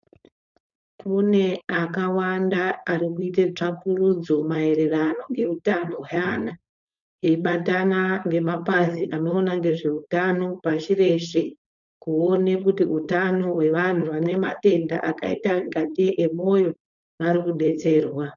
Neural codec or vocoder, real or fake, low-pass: codec, 16 kHz, 4.8 kbps, FACodec; fake; 7.2 kHz